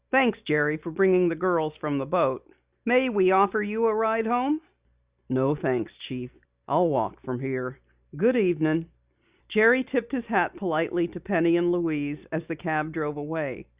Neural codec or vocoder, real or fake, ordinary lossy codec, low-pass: none; real; Opus, 64 kbps; 3.6 kHz